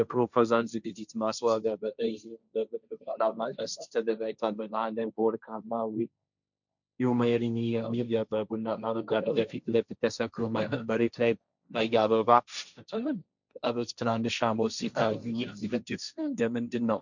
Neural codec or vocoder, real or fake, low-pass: codec, 16 kHz, 1.1 kbps, Voila-Tokenizer; fake; 7.2 kHz